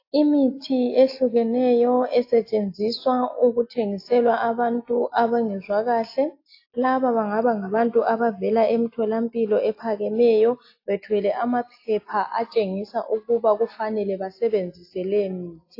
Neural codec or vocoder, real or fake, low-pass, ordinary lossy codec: none; real; 5.4 kHz; AAC, 32 kbps